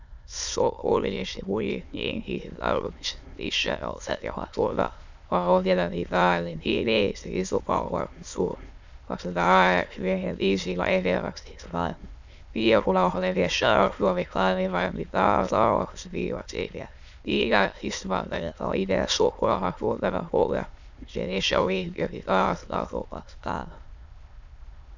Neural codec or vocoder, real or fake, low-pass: autoencoder, 22.05 kHz, a latent of 192 numbers a frame, VITS, trained on many speakers; fake; 7.2 kHz